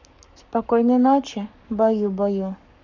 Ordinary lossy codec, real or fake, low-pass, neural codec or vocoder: none; fake; 7.2 kHz; codec, 44.1 kHz, 7.8 kbps, Pupu-Codec